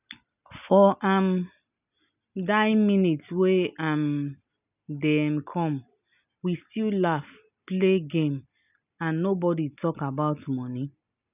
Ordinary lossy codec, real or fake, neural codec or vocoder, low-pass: none; real; none; 3.6 kHz